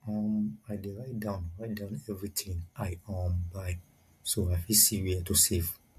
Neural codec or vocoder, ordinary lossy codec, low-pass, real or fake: none; MP3, 64 kbps; 14.4 kHz; real